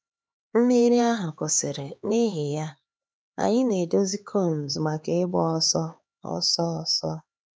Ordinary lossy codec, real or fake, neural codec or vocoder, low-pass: none; fake; codec, 16 kHz, 4 kbps, X-Codec, HuBERT features, trained on LibriSpeech; none